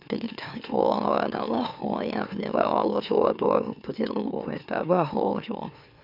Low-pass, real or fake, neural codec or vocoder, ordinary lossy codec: 5.4 kHz; fake; autoencoder, 44.1 kHz, a latent of 192 numbers a frame, MeloTTS; none